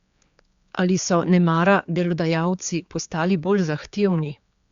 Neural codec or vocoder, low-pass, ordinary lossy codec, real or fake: codec, 16 kHz, 4 kbps, X-Codec, HuBERT features, trained on general audio; 7.2 kHz; Opus, 64 kbps; fake